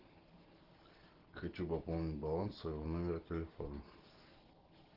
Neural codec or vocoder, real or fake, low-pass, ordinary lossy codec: none; real; 5.4 kHz; Opus, 16 kbps